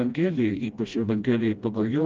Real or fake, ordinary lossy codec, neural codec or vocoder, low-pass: fake; Opus, 32 kbps; codec, 16 kHz, 1 kbps, FreqCodec, smaller model; 7.2 kHz